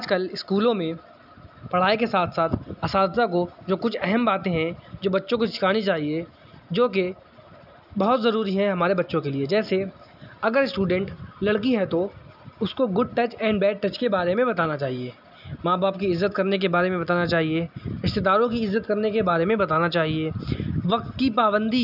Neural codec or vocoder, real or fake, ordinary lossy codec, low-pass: none; real; none; 5.4 kHz